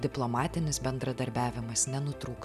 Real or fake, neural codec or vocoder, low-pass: real; none; 14.4 kHz